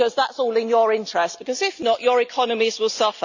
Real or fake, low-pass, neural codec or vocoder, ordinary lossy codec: real; 7.2 kHz; none; none